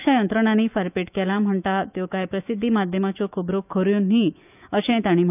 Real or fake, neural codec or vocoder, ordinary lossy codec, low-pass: fake; autoencoder, 48 kHz, 128 numbers a frame, DAC-VAE, trained on Japanese speech; none; 3.6 kHz